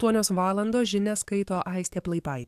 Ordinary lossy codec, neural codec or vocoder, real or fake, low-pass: AAC, 96 kbps; codec, 44.1 kHz, 7.8 kbps, DAC; fake; 14.4 kHz